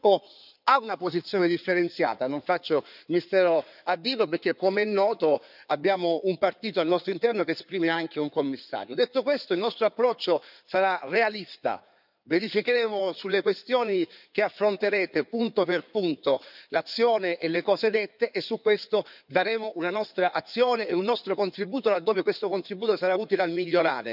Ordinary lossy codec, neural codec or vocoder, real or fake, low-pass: none; codec, 16 kHz in and 24 kHz out, 2.2 kbps, FireRedTTS-2 codec; fake; 5.4 kHz